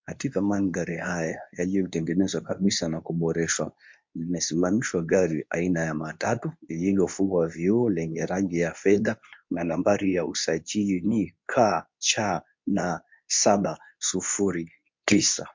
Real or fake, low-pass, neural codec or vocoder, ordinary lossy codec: fake; 7.2 kHz; codec, 24 kHz, 0.9 kbps, WavTokenizer, medium speech release version 2; MP3, 48 kbps